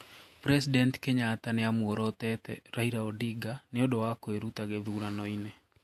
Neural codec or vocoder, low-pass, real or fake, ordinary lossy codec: vocoder, 48 kHz, 128 mel bands, Vocos; 14.4 kHz; fake; MP3, 64 kbps